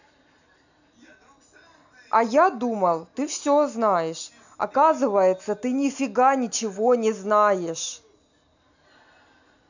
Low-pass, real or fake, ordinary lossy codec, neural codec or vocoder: 7.2 kHz; real; none; none